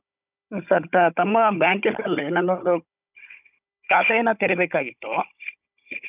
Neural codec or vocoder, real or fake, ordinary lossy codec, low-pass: codec, 16 kHz, 16 kbps, FunCodec, trained on Chinese and English, 50 frames a second; fake; none; 3.6 kHz